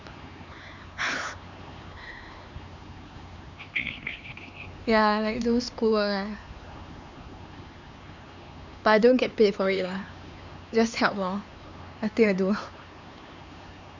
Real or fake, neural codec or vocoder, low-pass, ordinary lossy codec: fake; codec, 16 kHz, 4 kbps, X-Codec, HuBERT features, trained on LibriSpeech; 7.2 kHz; none